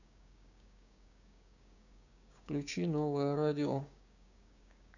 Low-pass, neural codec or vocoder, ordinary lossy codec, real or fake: 7.2 kHz; codec, 16 kHz, 6 kbps, DAC; none; fake